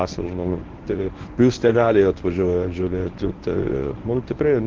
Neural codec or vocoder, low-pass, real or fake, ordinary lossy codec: codec, 24 kHz, 0.9 kbps, WavTokenizer, medium speech release version 1; 7.2 kHz; fake; Opus, 16 kbps